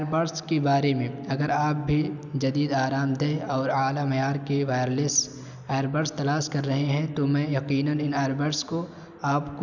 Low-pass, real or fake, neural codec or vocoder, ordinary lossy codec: 7.2 kHz; real; none; none